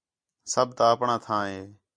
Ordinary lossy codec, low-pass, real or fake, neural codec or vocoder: MP3, 64 kbps; 9.9 kHz; real; none